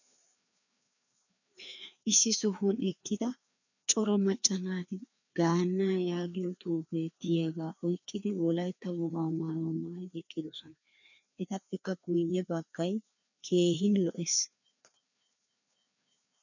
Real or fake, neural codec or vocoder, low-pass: fake; codec, 16 kHz, 2 kbps, FreqCodec, larger model; 7.2 kHz